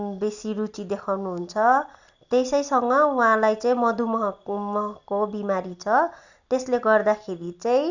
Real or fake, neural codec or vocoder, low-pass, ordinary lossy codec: real; none; 7.2 kHz; none